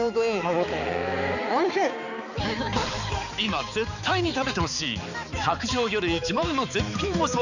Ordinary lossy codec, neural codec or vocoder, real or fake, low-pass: none; codec, 16 kHz, 4 kbps, X-Codec, HuBERT features, trained on balanced general audio; fake; 7.2 kHz